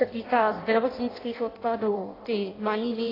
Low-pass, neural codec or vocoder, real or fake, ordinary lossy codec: 5.4 kHz; codec, 16 kHz in and 24 kHz out, 0.6 kbps, FireRedTTS-2 codec; fake; AAC, 24 kbps